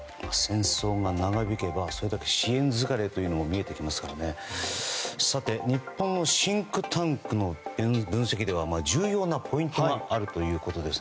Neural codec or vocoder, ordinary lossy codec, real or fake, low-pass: none; none; real; none